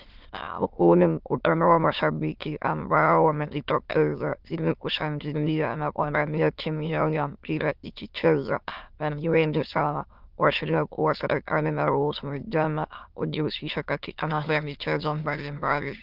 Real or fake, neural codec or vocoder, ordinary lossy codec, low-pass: fake; autoencoder, 22.05 kHz, a latent of 192 numbers a frame, VITS, trained on many speakers; Opus, 24 kbps; 5.4 kHz